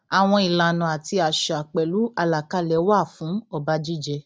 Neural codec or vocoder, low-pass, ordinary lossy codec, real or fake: none; none; none; real